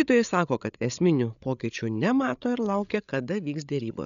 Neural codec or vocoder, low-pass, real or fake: codec, 16 kHz, 8 kbps, FreqCodec, larger model; 7.2 kHz; fake